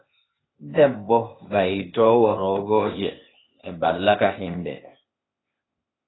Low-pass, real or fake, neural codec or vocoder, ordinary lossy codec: 7.2 kHz; fake; codec, 16 kHz, 0.8 kbps, ZipCodec; AAC, 16 kbps